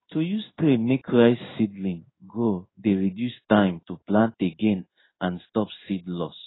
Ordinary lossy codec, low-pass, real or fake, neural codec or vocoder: AAC, 16 kbps; 7.2 kHz; fake; codec, 16 kHz in and 24 kHz out, 1 kbps, XY-Tokenizer